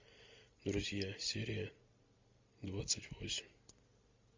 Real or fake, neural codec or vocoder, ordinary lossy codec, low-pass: real; none; MP3, 48 kbps; 7.2 kHz